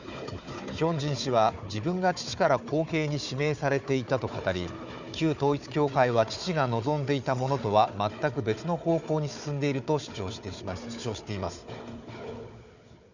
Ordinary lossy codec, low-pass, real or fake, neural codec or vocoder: none; 7.2 kHz; fake; codec, 16 kHz, 4 kbps, FunCodec, trained on Chinese and English, 50 frames a second